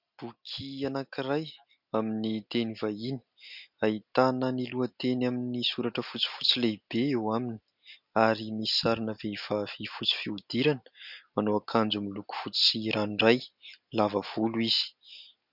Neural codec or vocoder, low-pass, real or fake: none; 5.4 kHz; real